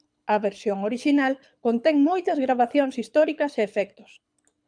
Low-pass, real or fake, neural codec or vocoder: 9.9 kHz; fake; codec, 24 kHz, 6 kbps, HILCodec